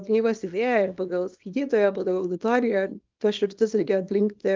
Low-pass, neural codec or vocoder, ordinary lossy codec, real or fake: 7.2 kHz; codec, 24 kHz, 0.9 kbps, WavTokenizer, small release; Opus, 32 kbps; fake